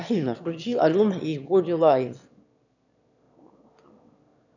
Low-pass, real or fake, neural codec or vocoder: 7.2 kHz; fake; autoencoder, 22.05 kHz, a latent of 192 numbers a frame, VITS, trained on one speaker